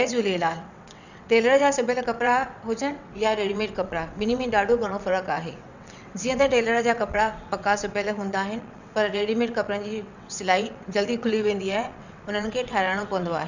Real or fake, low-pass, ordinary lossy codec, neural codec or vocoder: fake; 7.2 kHz; none; vocoder, 22.05 kHz, 80 mel bands, WaveNeXt